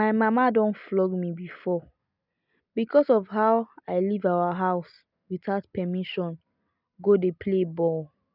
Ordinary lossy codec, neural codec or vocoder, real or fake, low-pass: none; none; real; 5.4 kHz